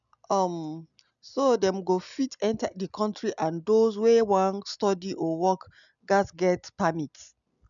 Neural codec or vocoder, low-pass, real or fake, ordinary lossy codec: none; 7.2 kHz; real; MP3, 96 kbps